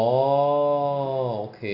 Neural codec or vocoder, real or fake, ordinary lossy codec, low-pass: none; real; none; 5.4 kHz